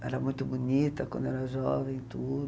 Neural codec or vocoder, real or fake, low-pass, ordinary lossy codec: none; real; none; none